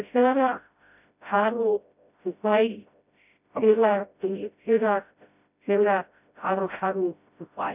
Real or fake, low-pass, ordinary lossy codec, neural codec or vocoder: fake; 3.6 kHz; none; codec, 16 kHz, 0.5 kbps, FreqCodec, smaller model